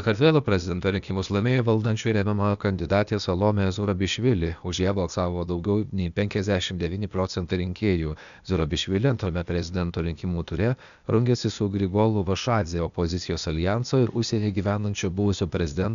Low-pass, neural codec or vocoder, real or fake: 7.2 kHz; codec, 16 kHz, 0.8 kbps, ZipCodec; fake